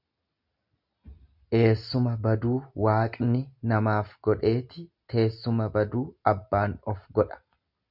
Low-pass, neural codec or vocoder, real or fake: 5.4 kHz; none; real